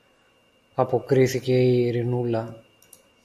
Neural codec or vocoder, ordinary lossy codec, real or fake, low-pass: none; AAC, 96 kbps; real; 14.4 kHz